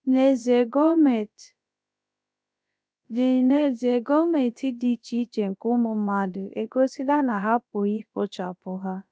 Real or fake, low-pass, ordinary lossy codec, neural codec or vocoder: fake; none; none; codec, 16 kHz, about 1 kbps, DyCAST, with the encoder's durations